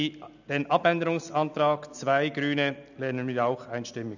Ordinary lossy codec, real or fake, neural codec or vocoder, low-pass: none; real; none; 7.2 kHz